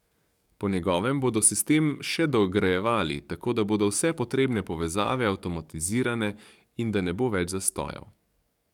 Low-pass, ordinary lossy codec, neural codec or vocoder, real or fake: 19.8 kHz; none; codec, 44.1 kHz, 7.8 kbps, DAC; fake